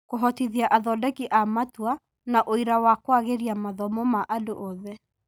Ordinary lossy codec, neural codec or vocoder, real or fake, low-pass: none; none; real; none